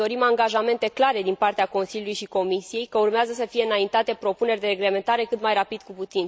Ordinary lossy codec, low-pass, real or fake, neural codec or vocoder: none; none; real; none